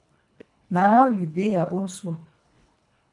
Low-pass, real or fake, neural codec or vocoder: 10.8 kHz; fake; codec, 24 kHz, 1.5 kbps, HILCodec